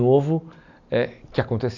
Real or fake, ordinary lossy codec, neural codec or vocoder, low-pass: real; none; none; 7.2 kHz